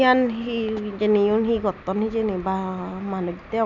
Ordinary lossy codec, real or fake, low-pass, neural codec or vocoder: none; real; 7.2 kHz; none